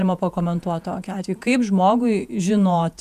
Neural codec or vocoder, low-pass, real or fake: vocoder, 48 kHz, 128 mel bands, Vocos; 14.4 kHz; fake